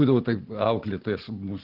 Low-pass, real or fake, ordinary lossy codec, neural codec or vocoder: 5.4 kHz; real; Opus, 16 kbps; none